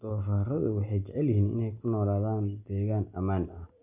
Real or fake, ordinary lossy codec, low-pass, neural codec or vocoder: real; none; 3.6 kHz; none